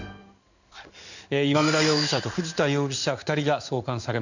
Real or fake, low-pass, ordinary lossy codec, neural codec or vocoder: fake; 7.2 kHz; none; codec, 16 kHz in and 24 kHz out, 1 kbps, XY-Tokenizer